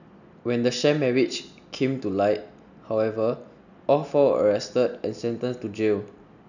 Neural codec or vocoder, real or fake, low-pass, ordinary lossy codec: none; real; 7.2 kHz; none